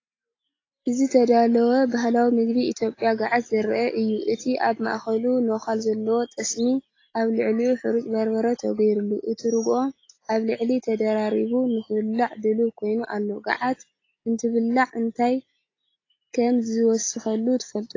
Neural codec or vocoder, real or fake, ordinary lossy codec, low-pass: none; real; AAC, 32 kbps; 7.2 kHz